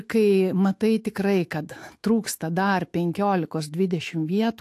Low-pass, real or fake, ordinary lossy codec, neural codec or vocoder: 14.4 kHz; fake; AAC, 64 kbps; autoencoder, 48 kHz, 128 numbers a frame, DAC-VAE, trained on Japanese speech